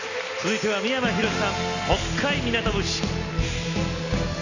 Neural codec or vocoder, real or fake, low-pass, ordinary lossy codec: none; real; 7.2 kHz; none